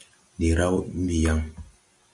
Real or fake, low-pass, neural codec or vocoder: real; 10.8 kHz; none